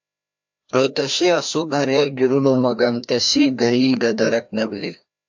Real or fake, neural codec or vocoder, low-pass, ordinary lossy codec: fake; codec, 16 kHz, 1 kbps, FreqCodec, larger model; 7.2 kHz; MP3, 64 kbps